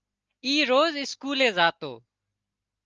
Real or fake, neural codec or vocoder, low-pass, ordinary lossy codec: real; none; 7.2 kHz; Opus, 24 kbps